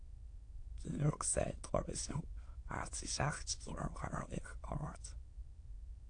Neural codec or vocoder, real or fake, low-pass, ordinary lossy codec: autoencoder, 22.05 kHz, a latent of 192 numbers a frame, VITS, trained on many speakers; fake; 9.9 kHz; AAC, 48 kbps